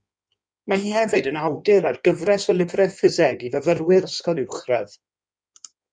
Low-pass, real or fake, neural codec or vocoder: 9.9 kHz; fake; codec, 16 kHz in and 24 kHz out, 1.1 kbps, FireRedTTS-2 codec